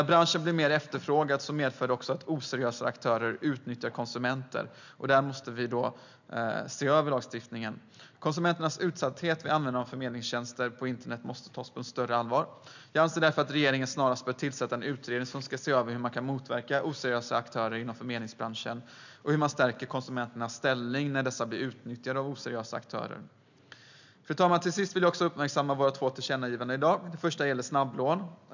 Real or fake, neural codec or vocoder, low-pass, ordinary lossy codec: real; none; 7.2 kHz; none